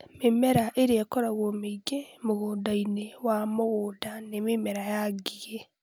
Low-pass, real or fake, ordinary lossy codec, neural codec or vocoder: none; real; none; none